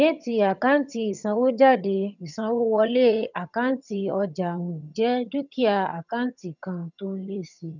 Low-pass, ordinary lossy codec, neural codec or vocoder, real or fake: 7.2 kHz; none; vocoder, 22.05 kHz, 80 mel bands, HiFi-GAN; fake